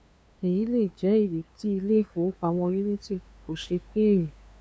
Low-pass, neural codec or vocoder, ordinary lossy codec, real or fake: none; codec, 16 kHz, 2 kbps, FunCodec, trained on LibriTTS, 25 frames a second; none; fake